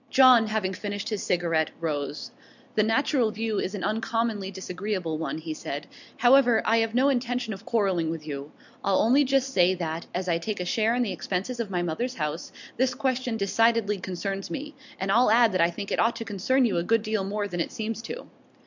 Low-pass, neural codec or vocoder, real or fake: 7.2 kHz; none; real